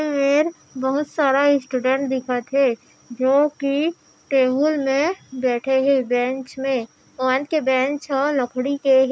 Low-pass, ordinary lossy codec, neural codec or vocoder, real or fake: none; none; none; real